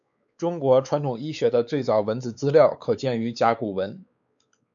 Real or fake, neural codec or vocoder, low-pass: fake; codec, 16 kHz, 4 kbps, X-Codec, WavLM features, trained on Multilingual LibriSpeech; 7.2 kHz